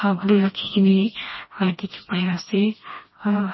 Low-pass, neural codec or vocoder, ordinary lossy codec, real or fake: 7.2 kHz; codec, 16 kHz, 1 kbps, FreqCodec, smaller model; MP3, 24 kbps; fake